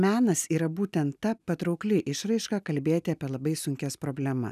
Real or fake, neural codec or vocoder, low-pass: real; none; 14.4 kHz